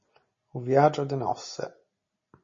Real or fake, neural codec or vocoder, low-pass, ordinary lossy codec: real; none; 7.2 kHz; MP3, 32 kbps